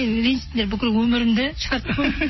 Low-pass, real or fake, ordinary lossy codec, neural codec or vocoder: 7.2 kHz; real; MP3, 24 kbps; none